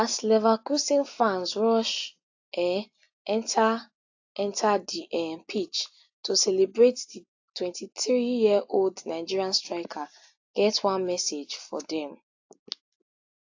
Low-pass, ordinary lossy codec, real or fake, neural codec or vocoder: 7.2 kHz; AAC, 48 kbps; real; none